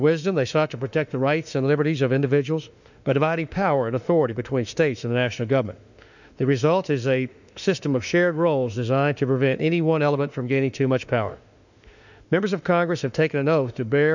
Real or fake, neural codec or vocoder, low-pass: fake; autoencoder, 48 kHz, 32 numbers a frame, DAC-VAE, trained on Japanese speech; 7.2 kHz